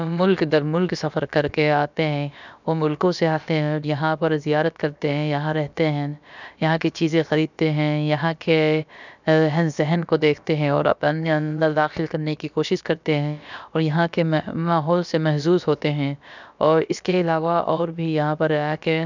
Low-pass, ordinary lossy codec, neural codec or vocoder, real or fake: 7.2 kHz; none; codec, 16 kHz, about 1 kbps, DyCAST, with the encoder's durations; fake